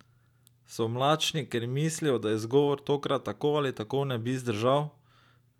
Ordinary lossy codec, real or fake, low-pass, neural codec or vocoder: none; real; 19.8 kHz; none